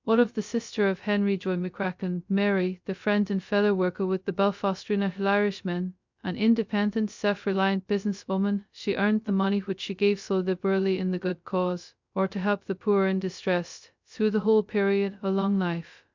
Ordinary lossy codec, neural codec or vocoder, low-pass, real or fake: MP3, 64 kbps; codec, 16 kHz, 0.2 kbps, FocalCodec; 7.2 kHz; fake